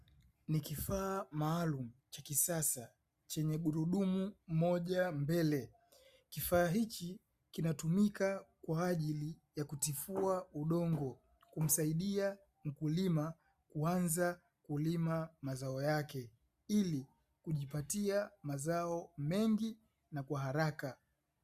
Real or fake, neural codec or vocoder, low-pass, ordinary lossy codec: real; none; 14.4 kHz; Opus, 64 kbps